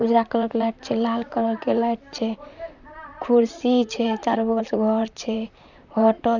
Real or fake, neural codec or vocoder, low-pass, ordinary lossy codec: fake; vocoder, 44.1 kHz, 128 mel bands, Pupu-Vocoder; 7.2 kHz; none